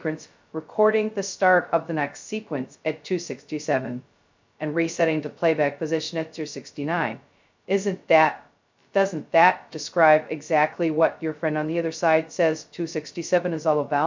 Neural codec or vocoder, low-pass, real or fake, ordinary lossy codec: codec, 16 kHz, 0.2 kbps, FocalCodec; 7.2 kHz; fake; MP3, 64 kbps